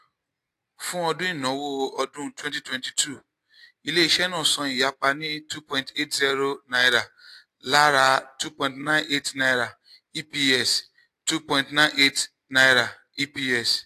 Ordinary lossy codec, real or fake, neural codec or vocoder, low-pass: AAC, 64 kbps; real; none; 14.4 kHz